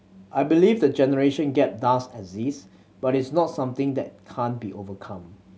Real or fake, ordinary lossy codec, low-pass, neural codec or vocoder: real; none; none; none